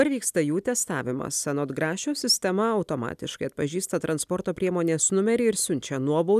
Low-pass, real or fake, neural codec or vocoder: 14.4 kHz; real; none